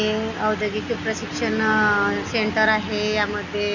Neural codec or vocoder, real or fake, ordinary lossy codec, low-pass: none; real; none; 7.2 kHz